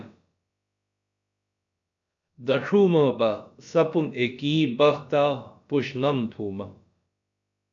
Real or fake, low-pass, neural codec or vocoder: fake; 7.2 kHz; codec, 16 kHz, about 1 kbps, DyCAST, with the encoder's durations